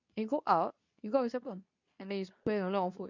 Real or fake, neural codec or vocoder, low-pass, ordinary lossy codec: fake; codec, 24 kHz, 0.9 kbps, WavTokenizer, medium speech release version 2; 7.2 kHz; none